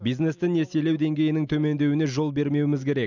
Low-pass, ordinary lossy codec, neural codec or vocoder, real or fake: 7.2 kHz; none; none; real